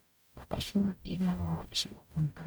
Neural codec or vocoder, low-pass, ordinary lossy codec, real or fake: codec, 44.1 kHz, 0.9 kbps, DAC; none; none; fake